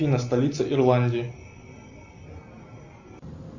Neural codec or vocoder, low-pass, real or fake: none; 7.2 kHz; real